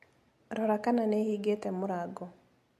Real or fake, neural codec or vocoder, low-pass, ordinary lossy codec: real; none; 14.4 kHz; MP3, 64 kbps